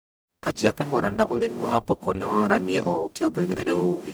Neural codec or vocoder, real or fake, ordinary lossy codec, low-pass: codec, 44.1 kHz, 0.9 kbps, DAC; fake; none; none